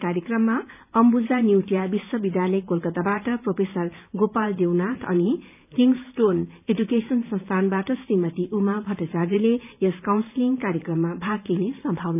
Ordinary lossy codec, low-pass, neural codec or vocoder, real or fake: none; 3.6 kHz; vocoder, 44.1 kHz, 128 mel bands every 512 samples, BigVGAN v2; fake